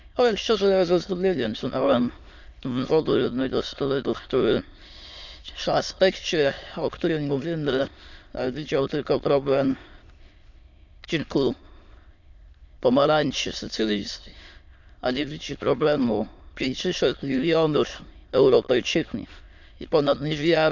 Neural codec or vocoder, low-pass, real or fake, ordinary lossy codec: autoencoder, 22.05 kHz, a latent of 192 numbers a frame, VITS, trained on many speakers; 7.2 kHz; fake; none